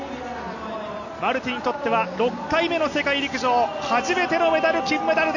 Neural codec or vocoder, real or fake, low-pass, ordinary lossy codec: none; real; 7.2 kHz; none